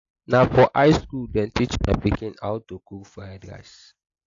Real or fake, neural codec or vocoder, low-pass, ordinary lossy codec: real; none; 7.2 kHz; AAC, 48 kbps